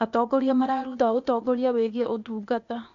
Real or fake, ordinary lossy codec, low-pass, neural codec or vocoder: fake; none; 7.2 kHz; codec, 16 kHz, 0.8 kbps, ZipCodec